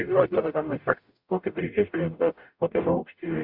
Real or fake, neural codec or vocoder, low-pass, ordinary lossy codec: fake; codec, 44.1 kHz, 0.9 kbps, DAC; 5.4 kHz; Opus, 64 kbps